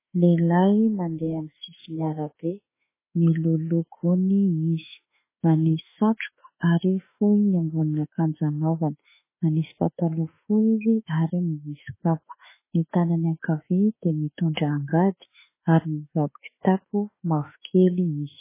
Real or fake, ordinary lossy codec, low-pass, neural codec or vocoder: fake; MP3, 16 kbps; 3.6 kHz; autoencoder, 48 kHz, 32 numbers a frame, DAC-VAE, trained on Japanese speech